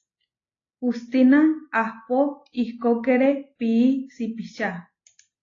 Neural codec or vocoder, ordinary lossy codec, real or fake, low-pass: none; AAC, 32 kbps; real; 7.2 kHz